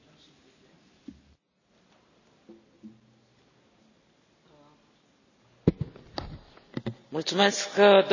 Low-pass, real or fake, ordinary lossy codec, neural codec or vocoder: 7.2 kHz; real; none; none